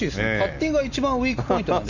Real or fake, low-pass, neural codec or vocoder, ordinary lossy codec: real; 7.2 kHz; none; none